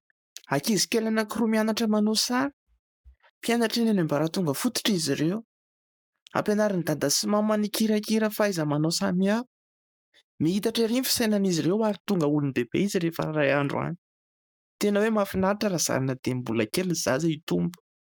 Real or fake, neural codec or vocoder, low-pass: fake; codec, 44.1 kHz, 7.8 kbps, Pupu-Codec; 19.8 kHz